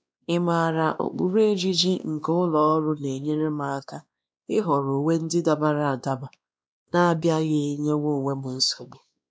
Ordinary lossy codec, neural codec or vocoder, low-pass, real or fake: none; codec, 16 kHz, 2 kbps, X-Codec, WavLM features, trained on Multilingual LibriSpeech; none; fake